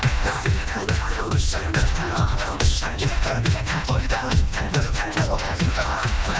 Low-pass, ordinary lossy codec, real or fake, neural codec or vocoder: none; none; fake; codec, 16 kHz, 1 kbps, FreqCodec, smaller model